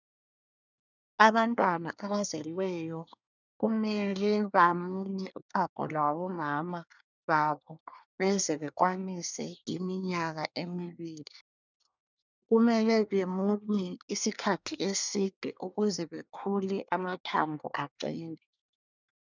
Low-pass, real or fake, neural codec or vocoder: 7.2 kHz; fake; codec, 24 kHz, 1 kbps, SNAC